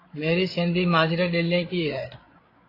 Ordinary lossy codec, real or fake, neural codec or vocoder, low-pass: AAC, 24 kbps; fake; codec, 16 kHz, 8 kbps, FreqCodec, larger model; 5.4 kHz